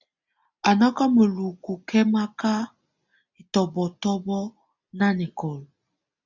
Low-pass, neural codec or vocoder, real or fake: 7.2 kHz; none; real